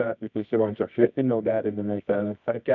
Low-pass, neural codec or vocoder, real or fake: 7.2 kHz; codec, 24 kHz, 0.9 kbps, WavTokenizer, medium music audio release; fake